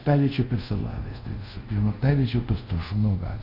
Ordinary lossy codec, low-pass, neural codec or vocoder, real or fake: MP3, 32 kbps; 5.4 kHz; codec, 24 kHz, 0.5 kbps, DualCodec; fake